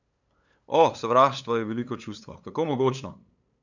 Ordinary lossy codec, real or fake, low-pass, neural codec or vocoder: none; fake; 7.2 kHz; codec, 16 kHz, 8 kbps, FunCodec, trained on LibriTTS, 25 frames a second